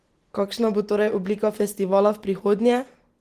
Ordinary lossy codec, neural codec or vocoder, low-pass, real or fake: Opus, 16 kbps; none; 14.4 kHz; real